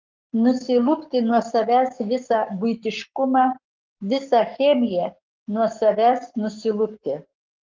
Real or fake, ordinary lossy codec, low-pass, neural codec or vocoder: fake; Opus, 32 kbps; 7.2 kHz; codec, 44.1 kHz, 7.8 kbps, Pupu-Codec